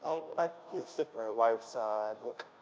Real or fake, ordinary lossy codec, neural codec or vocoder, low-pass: fake; none; codec, 16 kHz, 0.5 kbps, FunCodec, trained on Chinese and English, 25 frames a second; none